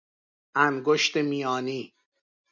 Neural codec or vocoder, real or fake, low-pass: none; real; 7.2 kHz